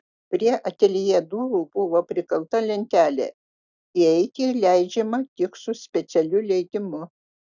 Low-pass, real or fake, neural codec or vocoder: 7.2 kHz; real; none